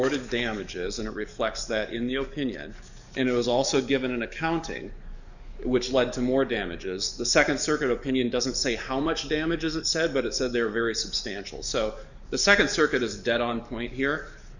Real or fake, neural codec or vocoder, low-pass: fake; codec, 44.1 kHz, 7.8 kbps, DAC; 7.2 kHz